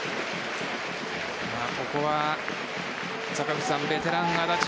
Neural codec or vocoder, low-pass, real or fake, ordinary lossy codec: none; none; real; none